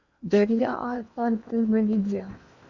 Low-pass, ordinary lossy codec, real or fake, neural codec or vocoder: 7.2 kHz; Opus, 64 kbps; fake; codec, 16 kHz in and 24 kHz out, 0.8 kbps, FocalCodec, streaming, 65536 codes